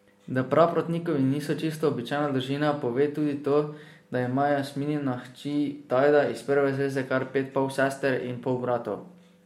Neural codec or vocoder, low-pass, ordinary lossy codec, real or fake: none; 19.8 kHz; MP3, 64 kbps; real